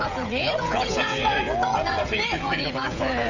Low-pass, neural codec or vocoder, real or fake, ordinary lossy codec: 7.2 kHz; codec, 16 kHz, 16 kbps, FreqCodec, smaller model; fake; none